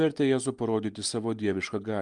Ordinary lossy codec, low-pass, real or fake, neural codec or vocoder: Opus, 32 kbps; 10.8 kHz; real; none